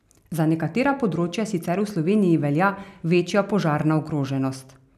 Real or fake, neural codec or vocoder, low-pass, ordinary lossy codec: real; none; 14.4 kHz; none